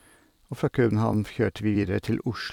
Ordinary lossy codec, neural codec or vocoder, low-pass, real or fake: none; vocoder, 44.1 kHz, 128 mel bands every 256 samples, BigVGAN v2; 19.8 kHz; fake